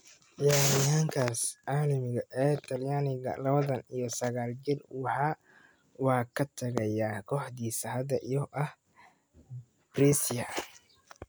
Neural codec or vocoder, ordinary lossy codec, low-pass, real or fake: none; none; none; real